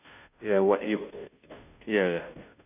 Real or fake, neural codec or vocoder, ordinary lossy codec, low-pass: fake; codec, 16 kHz, 0.5 kbps, FunCodec, trained on Chinese and English, 25 frames a second; none; 3.6 kHz